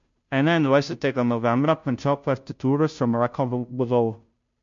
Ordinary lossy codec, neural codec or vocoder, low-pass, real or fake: MP3, 48 kbps; codec, 16 kHz, 0.5 kbps, FunCodec, trained on Chinese and English, 25 frames a second; 7.2 kHz; fake